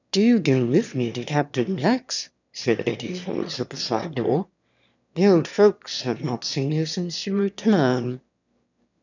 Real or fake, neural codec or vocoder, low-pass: fake; autoencoder, 22.05 kHz, a latent of 192 numbers a frame, VITS, trained on one speaker; 7.2 kHz